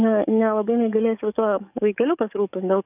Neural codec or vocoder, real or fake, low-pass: codec, 44.1 kHz, 7.8 kbps, DAC; fake; 3.6 kHz